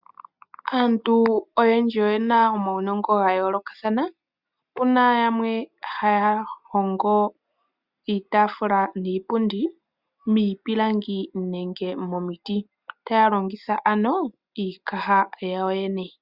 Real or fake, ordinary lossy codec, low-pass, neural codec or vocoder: real; Opus, 64 kbps; 5.4 kHz; none